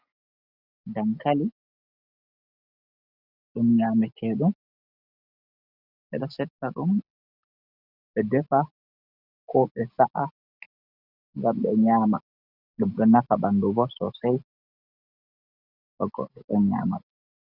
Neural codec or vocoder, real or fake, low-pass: none; real; 5.4 kHz